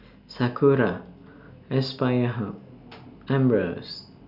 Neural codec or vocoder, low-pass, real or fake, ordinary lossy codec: none; 5.4 kHz; real; none